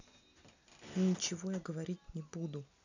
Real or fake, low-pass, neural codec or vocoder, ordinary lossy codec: real; 7.2 kHz; none; none